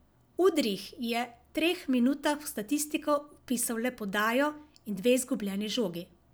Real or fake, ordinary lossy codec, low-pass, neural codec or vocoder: real; none; none; none